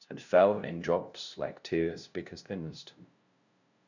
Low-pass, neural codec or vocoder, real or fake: 7.2 kHz; codec, 16 kHz, 0.5 kbps, FunCodec, trained on LibriTTS, 25 frames a second; fake